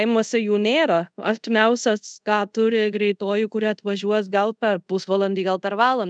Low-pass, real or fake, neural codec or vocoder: 9.9 kHz; fake; codec, 24 kHz, 0.5 kbps, DualCodec